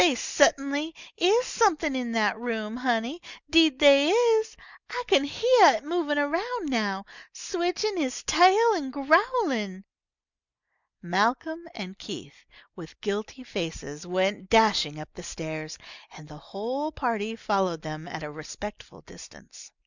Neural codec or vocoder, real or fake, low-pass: none; real; 7.2 kHz